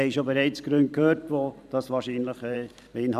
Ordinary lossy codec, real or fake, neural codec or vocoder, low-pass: none; fake; vocoder, 44.1 kHz, 128 mel bands every 512 samples, BigVGAN v2; 14.4 kHz